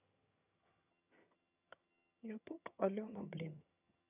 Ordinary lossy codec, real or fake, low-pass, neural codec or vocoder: none; fake; 3.6 kHz; vocoder, 22.05 kHz, 80 mel bands, HiFi-GAN